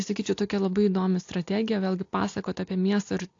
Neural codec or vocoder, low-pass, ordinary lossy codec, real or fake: none; 7.2 kHz; AAC, 48 kbps; real